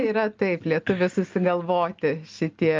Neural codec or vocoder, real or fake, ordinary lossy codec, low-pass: none; real; Opus, 24 kbps; 7.2 kHz